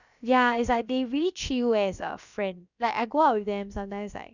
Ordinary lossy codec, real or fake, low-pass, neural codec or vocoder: none; fake; 7.2 kHz; codec, 16 kHz, about 1 kbps, DyCAST, with the encoder's durations